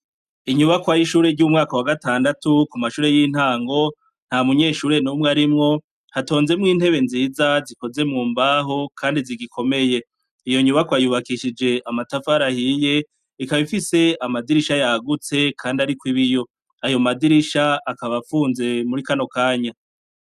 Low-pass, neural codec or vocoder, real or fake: 14.4 kHz; none; real